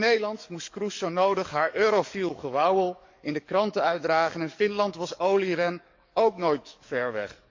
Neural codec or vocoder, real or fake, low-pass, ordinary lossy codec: codec, 44.1 kHz, 7.8 kbps, DAC; fake; 7.2 kHz; MP3, 64 kbps